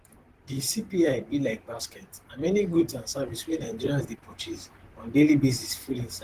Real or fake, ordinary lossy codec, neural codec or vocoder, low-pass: fake; Opus, 16 kbps; vocoder, 44.1 kHz, 128 mel bands, Pupu-Vocoder; 14.4 kHz